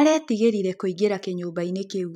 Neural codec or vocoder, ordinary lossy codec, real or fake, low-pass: vocoder, 44.1 kHz, 128 mel bands every 256 samples, BigVGAN v2; none; fake; 19.8 kHz